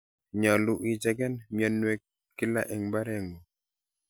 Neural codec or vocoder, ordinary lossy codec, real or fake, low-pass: none; none; real; none